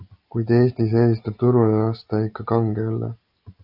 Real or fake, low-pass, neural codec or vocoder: real; 5.4 kHz; none